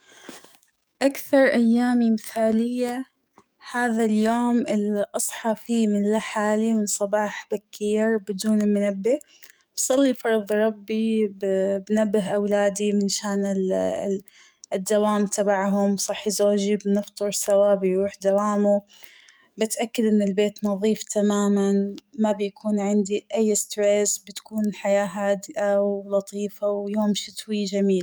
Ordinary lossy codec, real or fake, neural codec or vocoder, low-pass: none; fake; codec, 44.1 kHz, 7.8 kbps, DAC; none